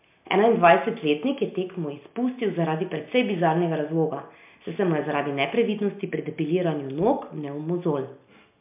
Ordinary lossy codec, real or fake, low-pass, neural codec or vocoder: MP3, 32 kbps; real; 3.6 kHz; none